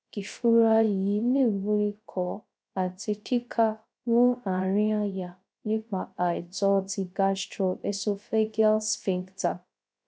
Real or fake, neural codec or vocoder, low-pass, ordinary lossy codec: fake; codec, 16 kHz, 0.3 kbps, FocalCodec; none; none